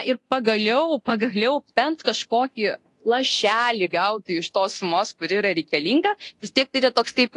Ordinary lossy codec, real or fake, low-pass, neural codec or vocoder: AAC, 48 kbps; fake; 10.8 kHz; codec, 24 kHz, 0.9 kbps, DualCodec